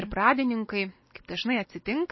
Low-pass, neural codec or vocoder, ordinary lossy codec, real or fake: 7.2 kHz; none; MP3, 24 kbps; real